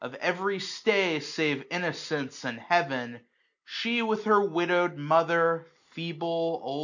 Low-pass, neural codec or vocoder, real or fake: 7.2 kHz; none; real